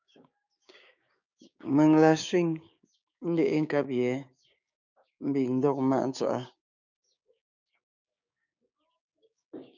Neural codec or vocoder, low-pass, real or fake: codec, 16 kHz, 6 kbps, DAC; 7.2 kHz; fake